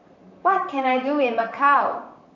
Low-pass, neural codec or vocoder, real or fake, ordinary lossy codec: 7.2 kHz; vocoder, 44.1 kHz, 128 mel bands, Pupu-Vocoder; fake; AAC, 48 kbps